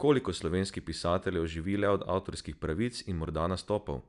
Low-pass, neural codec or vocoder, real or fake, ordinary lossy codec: 10.8 kHz; none; real; none